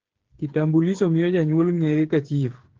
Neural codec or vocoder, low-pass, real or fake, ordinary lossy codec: codec, 16 kHz, 8 kbps, FreqCodec, smaller model; 7.2 kHz; fake; Opus, 16 kbps